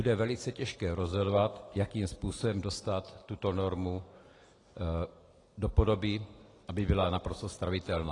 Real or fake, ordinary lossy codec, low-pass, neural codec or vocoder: real; AAC, 32 kbps; 10.8 kHz; none